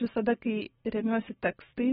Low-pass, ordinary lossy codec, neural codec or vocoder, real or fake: 7.2 kHz; AAC, 16 kbps; none; real